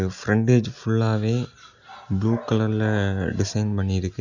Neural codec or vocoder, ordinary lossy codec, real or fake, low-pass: none; none; real; 7.2 kHz